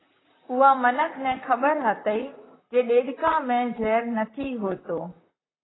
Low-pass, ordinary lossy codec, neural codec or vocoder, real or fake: 7.2 kHz; AAC, 16 kbps; vocoder, 44.1 kHz, 128 mel bands, Pupu-Vocoder; fake